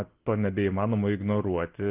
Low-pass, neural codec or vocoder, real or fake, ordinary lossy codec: 3.6 kHz; none; real; Opus, 16 kbps